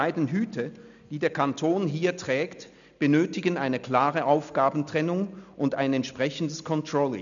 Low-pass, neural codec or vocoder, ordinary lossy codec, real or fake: 7.2 kHz; none; none; real